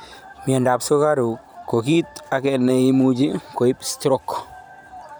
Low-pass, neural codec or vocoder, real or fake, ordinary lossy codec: none; vocoder, 44.1 kHz, 128 mel bands every 512 samples, BigVGAN v2; fake; none